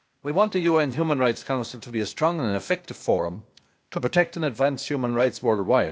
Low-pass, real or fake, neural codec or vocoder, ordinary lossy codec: none; fake; codec, 16 kHz, 0.8 kbps, ZipCodec; none